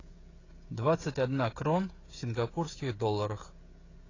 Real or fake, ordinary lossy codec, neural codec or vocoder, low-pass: fake; AAC, 32 kbps; codec, 16 kHz, 16 kbps, FreqCodec, smaller model; 7.2 kHz